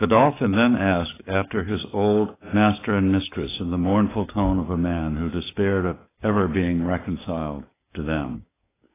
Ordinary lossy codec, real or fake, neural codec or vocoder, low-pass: AAC, 16 kbps; fake; codec, 16 kHz, 6 kbps, DAC; 3.6 kHz